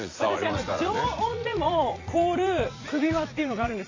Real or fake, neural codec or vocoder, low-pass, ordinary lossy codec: fake; vocoder, 44.1 kHz, 80 mel bands, Vocos; 7.2 kHz; AAC, 32 kbps